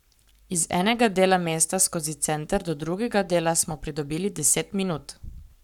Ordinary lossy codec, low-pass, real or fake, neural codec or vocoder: none; 19.8 kHz; fake; codec, 44.1 kHz, 7.8 kbps, Pupu-Codec